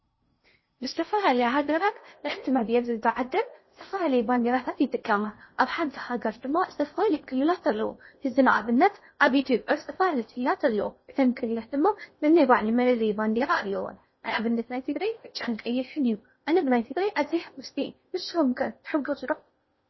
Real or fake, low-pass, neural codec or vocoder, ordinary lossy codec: fake; 7.2 kHz; codec, 16 kHz in and 24 kHz out, 0.6 kbps, FocalCodec, streaming, 2048 codes; MP3, 24 kbps